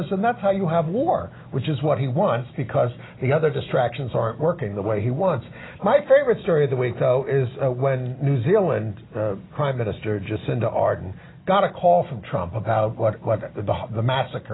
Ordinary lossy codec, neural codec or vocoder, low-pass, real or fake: AAC, 16 kbps; none; 7.2 kHz; real